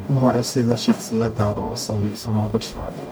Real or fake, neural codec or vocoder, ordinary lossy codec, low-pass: fake; codec, 44.1 kHz, 0.9 kbps, DAC; none; none